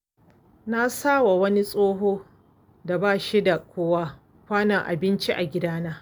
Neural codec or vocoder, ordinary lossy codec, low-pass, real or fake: none; none; none; real